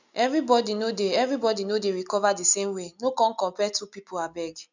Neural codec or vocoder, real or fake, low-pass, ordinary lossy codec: none; real; 7.2 kHz; none